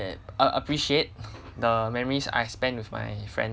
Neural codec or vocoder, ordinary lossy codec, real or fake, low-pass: none; none; real; none